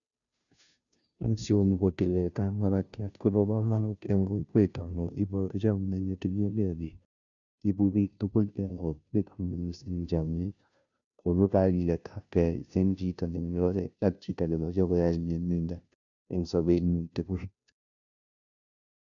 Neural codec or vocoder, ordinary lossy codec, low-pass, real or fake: codec, 16 kHz, 0.5 kbps, FunCodec, trained on Chinese and English, 25 frames a second; none; 7.2 kHz; fake